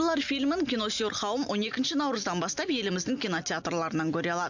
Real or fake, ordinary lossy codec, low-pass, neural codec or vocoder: real; none; 7.2 kHz; none